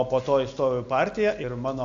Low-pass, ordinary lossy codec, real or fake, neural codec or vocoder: 7.2 kHz; AAC, 64 kbps; real; none